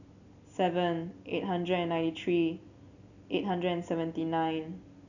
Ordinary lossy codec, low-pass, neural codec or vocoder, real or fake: none; 7.2 kHz; none; real